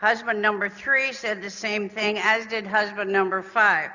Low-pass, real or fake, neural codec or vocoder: 7.2 kHz; real; none